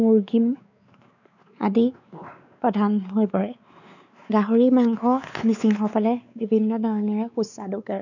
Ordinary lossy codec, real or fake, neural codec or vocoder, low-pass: none; fake; codec, 16 kHz, 4 kbps, X-Codec, WavLM features, trained on Multilingual LibriSpeech; 7.2 kHz